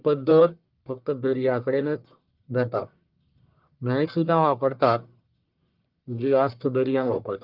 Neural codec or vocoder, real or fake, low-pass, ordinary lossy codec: codec, 44.1 kHz, 1.7 kbps, Pupu-Codec; fake; 5.4 kHz; Opus, 24 kbps